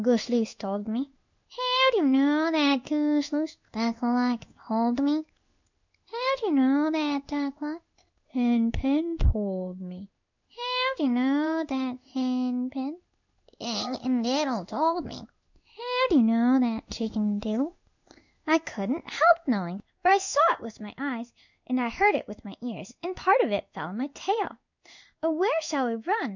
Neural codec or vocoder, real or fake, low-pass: codec, 16 kHz in and 24 kHz out, 1 kbps, XY-Tokenizer; fake; 7.2 kHz